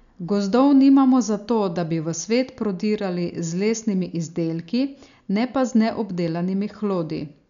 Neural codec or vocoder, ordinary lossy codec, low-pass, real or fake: none; none; 7.2 kHz; real